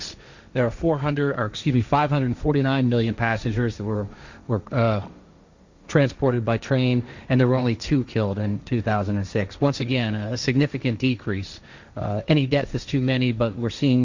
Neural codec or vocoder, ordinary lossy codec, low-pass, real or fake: codec, 16 kHz, 1.1 kbps, Voila-Tokenizer; Opus, 64 kbps; 7.2 kHz; fake